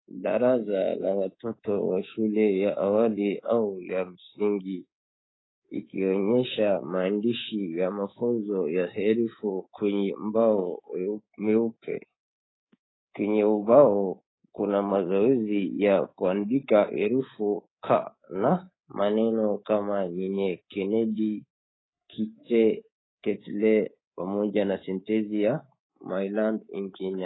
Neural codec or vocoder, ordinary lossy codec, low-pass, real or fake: codec, 24 kHz, 3.1 kbps, DualCodec; AAC, 16 kbps; 7.2 kHz; fake